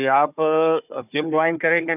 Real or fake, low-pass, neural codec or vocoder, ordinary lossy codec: fake; 3.6 kHz; codec, 16 kHz, 4 kbps, FunCodec, trained on Chinese and English, 50 frames a second; none